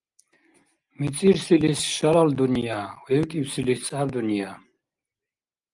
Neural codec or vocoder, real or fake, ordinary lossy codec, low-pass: none; real; Opus, 32 kbps; 10.8 kHz